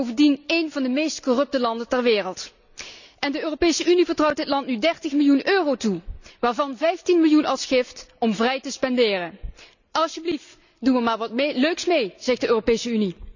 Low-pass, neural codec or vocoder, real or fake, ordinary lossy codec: 7.2 kHz; none; real; none